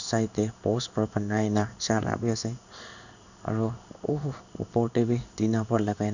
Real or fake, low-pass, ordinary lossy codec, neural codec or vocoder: fake; 7.2 kHz; none; codec, 16 kHz in and 24 kHz out, 1 kbps, XY-Tokenizer